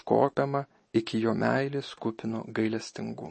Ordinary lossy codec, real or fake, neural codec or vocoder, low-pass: MP3, 32 kbps; real; none; 10.8 kHz